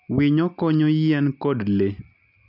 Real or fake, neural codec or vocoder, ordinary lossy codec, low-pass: real; none; none; 5.4 kHz